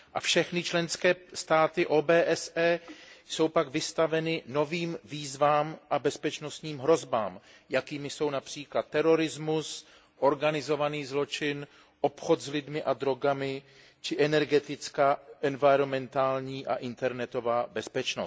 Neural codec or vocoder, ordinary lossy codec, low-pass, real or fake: none; none; none; real